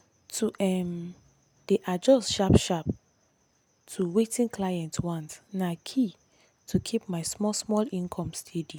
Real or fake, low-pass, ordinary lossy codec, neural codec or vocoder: real; 19.8 kHz; none; none